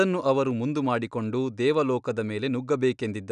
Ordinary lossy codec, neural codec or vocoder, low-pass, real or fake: none; none; 9.9 kHz; real